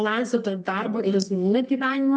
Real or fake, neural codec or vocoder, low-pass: fake; codec, 24 kHz, 0.9 kbps, WavTokenizer, medium music audio release; 9.9 kHz